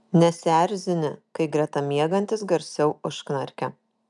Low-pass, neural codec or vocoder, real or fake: 10.8 kHz; none; real